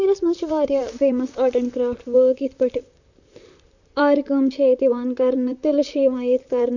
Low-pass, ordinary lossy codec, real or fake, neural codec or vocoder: 7.2 kHz; none; fake; vocoder, 44.1 kHz, 128 mel bands, Pupu-Vocoder